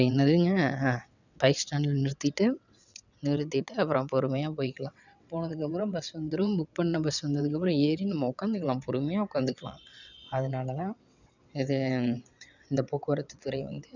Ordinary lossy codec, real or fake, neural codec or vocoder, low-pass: none; fake; vocoder, 22.05 kHz, 80 mel bands, WaveNeXt; 7.2 kHz